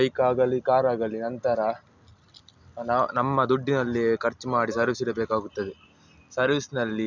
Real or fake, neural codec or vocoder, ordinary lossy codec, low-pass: real; none; none; 7.2 kHz